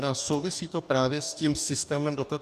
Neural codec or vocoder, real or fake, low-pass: codec, 44.1 kHz, 2.6 kbps, DAC; fake; 14.4 kHz